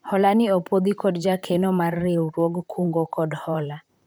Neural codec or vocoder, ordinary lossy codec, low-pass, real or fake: vocoder, 44.1 kHz, 128 mel bands, Pupu-Vocoder; none; none; fake